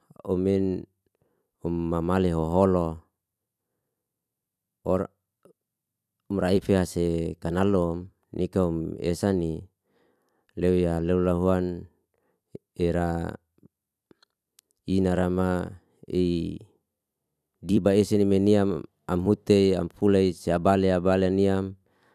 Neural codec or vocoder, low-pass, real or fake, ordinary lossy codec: vocoder, 44.1 kHz, 128 mel bands every 256 samples, BigVGAN v2; 14.4 kHz; fake; none